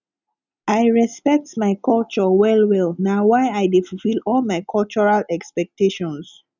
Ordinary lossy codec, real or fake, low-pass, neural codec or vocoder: none; real; 7.2 kHz; none